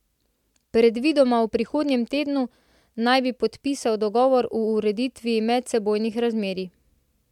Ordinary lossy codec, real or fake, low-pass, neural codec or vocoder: MP3, 96 kbps; real; 19.8 kHz; none